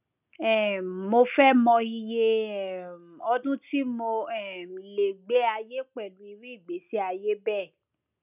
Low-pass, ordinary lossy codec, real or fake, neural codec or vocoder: 3.6 kHz; none; real; none